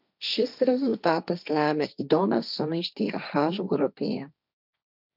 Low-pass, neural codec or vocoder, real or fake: 5.4 kHz; codec, 16 kHz, 1.1 kbps, Voila-Tokenizer; fake